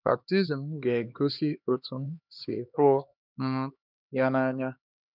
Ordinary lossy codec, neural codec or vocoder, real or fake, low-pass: none; codec, 16 kHz, 2 kbps, X-Codec, HuBERT features, trained on LibriSpeech; fake; 5.4 kHz